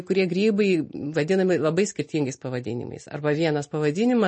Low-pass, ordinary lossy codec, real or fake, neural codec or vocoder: 10.8 kHz; MP3, 32 kbps; real; none